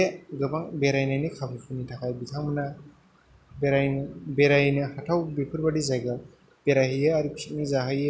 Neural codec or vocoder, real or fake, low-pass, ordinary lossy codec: none; real; none; none